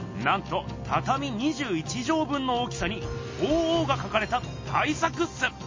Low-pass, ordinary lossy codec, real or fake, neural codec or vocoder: 7.2 kHz; MP3, 32 kbps; real; none